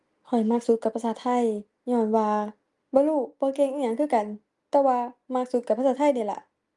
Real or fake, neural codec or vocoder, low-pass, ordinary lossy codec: real; none; 10.8 kHz; Opus, 32 kbps